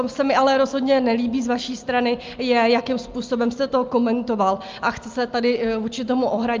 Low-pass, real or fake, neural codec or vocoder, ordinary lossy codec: 7.2 kHz; real; none; Opus, 24 kbps